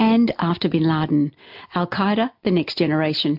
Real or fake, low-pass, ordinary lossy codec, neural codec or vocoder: real; 5.4 kHz; MP3, 48 kbps; none